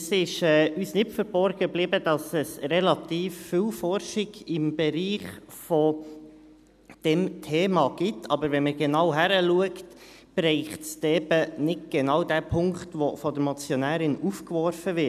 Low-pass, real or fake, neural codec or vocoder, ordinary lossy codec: 14.4 kHz; real; none; none